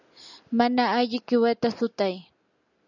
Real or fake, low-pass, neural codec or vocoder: real; 7.2 kHz; none